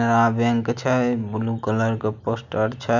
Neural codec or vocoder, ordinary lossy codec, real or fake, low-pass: none; none; real; 7.2 kHz